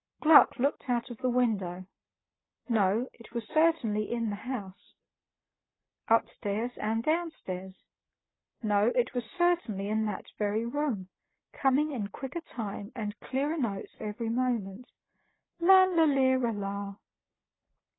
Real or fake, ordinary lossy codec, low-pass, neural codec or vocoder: real; AAC, 16 kbps; 7.2 kHz; none